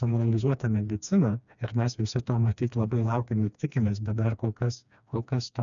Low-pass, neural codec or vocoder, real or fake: 7.2 kHz; codec, 16 kHz, 2 kbps, FreqCodec, smaller model; fake